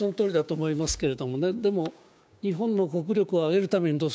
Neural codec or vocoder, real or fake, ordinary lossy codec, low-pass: codec, 16 kHz, 6 kbps, DAC; fake; none; none